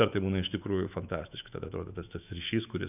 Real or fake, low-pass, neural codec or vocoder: fake; 3.6 kHz; autoencoder, 48 kHz, 128 numbers a frame, DAC-VAE, trained on Japanese speech